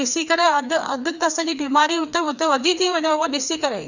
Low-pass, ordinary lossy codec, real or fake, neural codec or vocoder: 7.2 kHz; none; fake; codec, 16 kHz, 2 kbps, FreqCodec, larger model